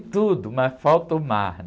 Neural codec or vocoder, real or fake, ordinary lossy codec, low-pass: none; real; none; none